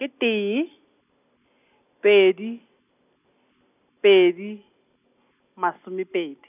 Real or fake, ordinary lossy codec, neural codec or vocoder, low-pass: real; none; none; 3.6 kHz